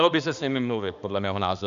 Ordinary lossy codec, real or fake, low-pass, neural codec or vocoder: Opus, 64 kbps; fake; 7.2 kHz; codec, 16 kHz, 2 kbps, X-Codec, HuBERT features, trained on balanced general audio